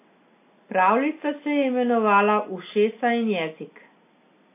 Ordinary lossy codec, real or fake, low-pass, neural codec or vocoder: AAC, 24 kbps; real; 3.6 kHz; none